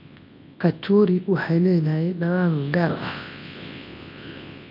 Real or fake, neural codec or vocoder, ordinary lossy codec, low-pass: fake; codec, 24 kHz, 0.9 kbps, WavTokenizer, large speech release; MP3, 32 kbps; 5.4 kHz